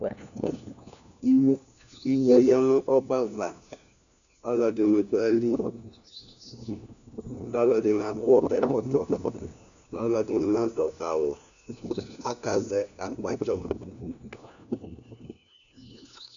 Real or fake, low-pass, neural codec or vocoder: fake; 7.2 kHz; codec, 16 kHz, 1 kbps, FunCodec, trained on LibriTTS, 50 frames a second